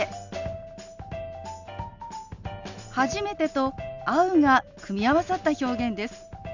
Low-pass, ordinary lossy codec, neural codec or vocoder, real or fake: 7.2 kHz; Opus, 64 kbps; none; real